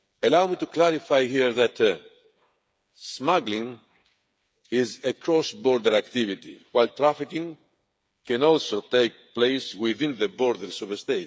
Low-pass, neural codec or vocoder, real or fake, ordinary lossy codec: none; codec, 16 kHz, 8 kbps, FreqCodec, smaller model; fake; none